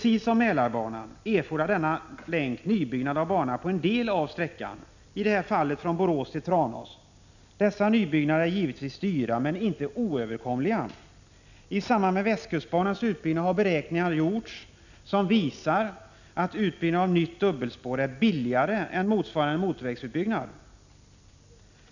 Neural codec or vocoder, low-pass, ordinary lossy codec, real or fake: none; 7.2 kHz; none; real